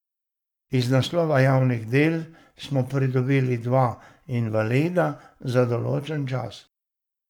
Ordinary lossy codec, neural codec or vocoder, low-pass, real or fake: none; codec, 44.1 kHz, 7.8 kbps, Pupu-Codec; 19.8 kHz; fake